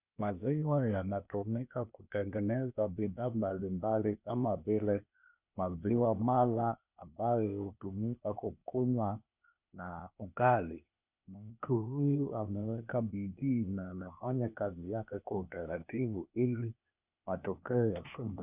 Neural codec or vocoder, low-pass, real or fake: codec, 16 kHz, 0.8 kbps, ZipCodec; 3.6 kHz; fake